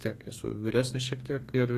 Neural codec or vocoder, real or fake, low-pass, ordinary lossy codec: codec, 32 kHz, 1.9 kbps, SNAC; fake; 14.4 kHz; AAC, 48 kbps